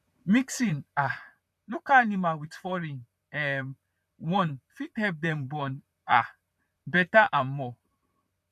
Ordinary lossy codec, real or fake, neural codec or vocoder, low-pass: none; fake; vocoder, 44.1 kHz, 128 mel bands, Pupu-Vocoder; 14.4 kHz